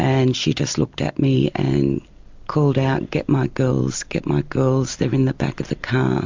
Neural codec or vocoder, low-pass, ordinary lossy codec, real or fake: none; 7.2 kHz; MP3, 64 kbps; real